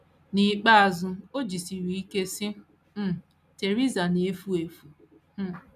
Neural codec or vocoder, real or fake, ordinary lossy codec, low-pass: none; real; none; 14.4 kHz